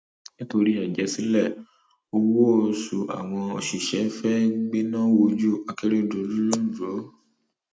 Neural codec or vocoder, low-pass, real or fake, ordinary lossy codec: none; none; real; none